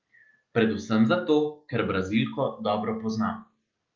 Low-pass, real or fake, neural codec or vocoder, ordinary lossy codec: 7.2 kHz; real; none; Opus, 24 kbps